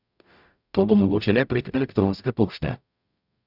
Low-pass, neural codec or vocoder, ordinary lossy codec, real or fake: 5.4 kHz; codec, 44.1 kHz, 0.9 kbps, DAC; none; fake